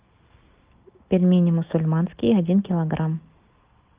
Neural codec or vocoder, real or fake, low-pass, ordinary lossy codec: none; real; 3.6 kHz; Opus, 32 kbps